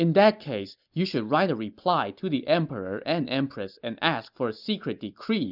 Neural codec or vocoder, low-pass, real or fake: none; 5.4 kHz; real